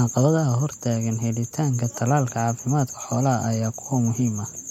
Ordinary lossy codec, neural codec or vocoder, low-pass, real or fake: MP3, 64 kbps; none; 19.8 kHz; real